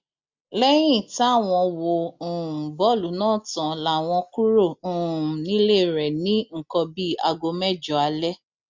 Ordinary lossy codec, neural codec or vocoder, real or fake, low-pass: MP3, 64 kbps; none; real; 7.2 kHz